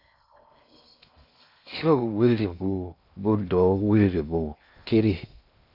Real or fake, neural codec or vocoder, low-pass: fake; codec, 16 kHz in and 24 kHz out, 0.6 kbps, FocalCodec, streaming, 4096 codes; 5.4 kHz